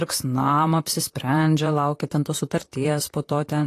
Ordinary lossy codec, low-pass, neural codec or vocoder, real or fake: AAC, 48 kbps; 14.4 kHz; vocoder, 44.1 kHz, 128 mel bands, Pupu-Vocoder; fake